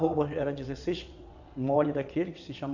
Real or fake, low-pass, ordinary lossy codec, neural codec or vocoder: fake; 7.2 kHz; none; vocoder, 22.05 kHz, 80 mel bands, WaveNeXt